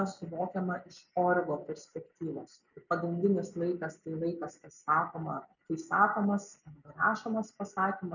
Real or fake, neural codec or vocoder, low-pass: real; none; 7.2 kHz